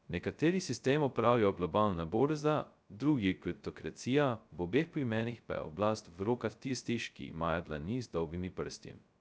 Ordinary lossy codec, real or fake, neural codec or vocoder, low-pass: none; fake; codec, 16 kHz, 0.2 kbps, FocalCodec; none